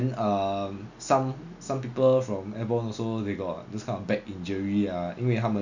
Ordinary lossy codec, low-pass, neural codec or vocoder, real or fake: none; 7.2 kHz; none; real